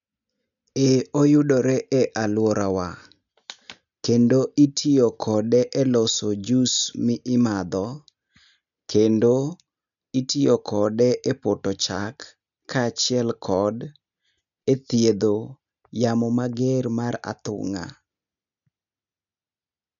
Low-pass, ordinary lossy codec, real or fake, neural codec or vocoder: 7.2 kHz; none; real; none